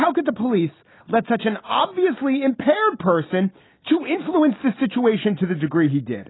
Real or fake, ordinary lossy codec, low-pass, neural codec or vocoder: real; AAC, 16 kbps; 7.2 kHz; none